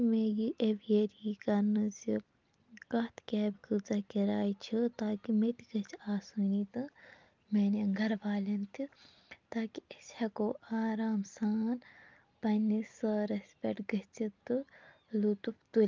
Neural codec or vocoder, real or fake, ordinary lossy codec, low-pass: none; real; Opus, 24 kbps; 7.2 kHz